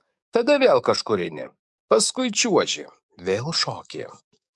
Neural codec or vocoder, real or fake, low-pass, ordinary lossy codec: codec, 44.1 kHz, 7.8 kbps, DAC; fake; 10.8 kHz; MP3, 96 kbps